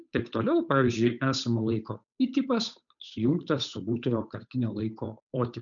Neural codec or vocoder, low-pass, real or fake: codec, 16 kHz, 8 kbps, FunCodec, trained on Chinese and English, 25 frames a second; 7.2 kHz; fake